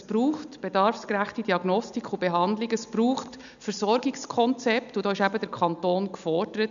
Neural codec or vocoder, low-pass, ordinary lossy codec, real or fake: none; 7.2 kHz; none; real